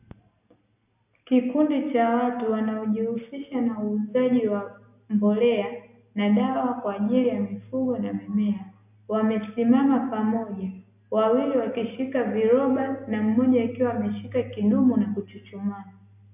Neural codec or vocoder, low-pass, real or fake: none; 3.6 kHz; real